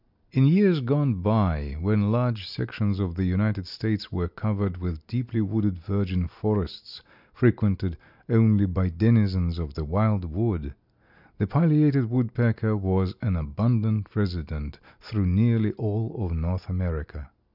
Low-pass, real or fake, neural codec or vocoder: 5.4 kHz; real; none